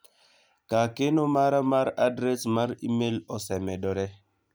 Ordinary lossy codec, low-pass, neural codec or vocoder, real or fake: none; none; none; real